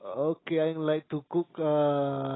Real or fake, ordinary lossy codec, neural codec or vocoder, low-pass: real; AAC, 16 kbps; none; 7.2 kHz